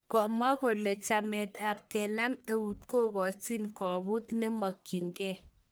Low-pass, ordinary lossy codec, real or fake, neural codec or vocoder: none; none; fake; codec, 44.1 kHz, 1.7 kbps, Pupu-Codec